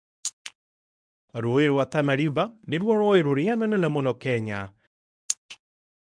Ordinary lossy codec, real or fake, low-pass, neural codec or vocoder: MP3, 96 kbps; fake; 9.9 kHz; codec, 24 kHz, 0.9 kbps, WavTokenizer, medium speech release version 1